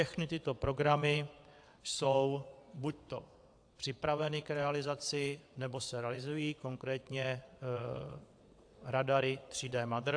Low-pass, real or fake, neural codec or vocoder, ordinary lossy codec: 9.9 kHz; fake; vocoder, 22.05 kHz, 80 mel bands, WaveNeXt; AAC, 64 kbps